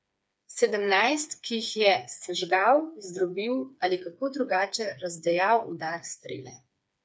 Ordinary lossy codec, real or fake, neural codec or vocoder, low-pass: none; fake; codec, 16 kHz, 4 kbps, FreqCodec, smaller model; none